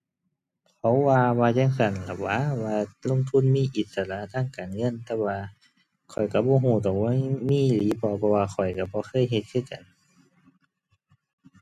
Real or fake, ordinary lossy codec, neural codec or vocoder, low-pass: real; none; none; 14.4 kHz